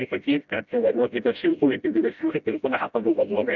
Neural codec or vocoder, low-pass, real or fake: codec, 16 kHz, 0.5 kbps, FreqCodec, smaller model; 7.2 kHz; fake